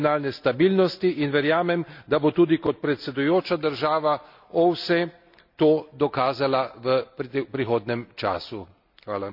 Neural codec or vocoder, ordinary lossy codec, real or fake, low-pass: none; none; real; 5.4 kHz